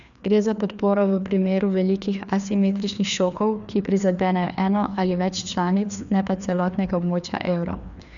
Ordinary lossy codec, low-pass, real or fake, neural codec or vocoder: none; 7.2 kHz; fake; codec, 16 kHz, 2 kbps, FreqCodec, larger model